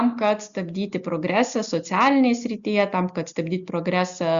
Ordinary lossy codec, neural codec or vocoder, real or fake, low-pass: Opus, 64 kbps; none; real; 7.2 kHz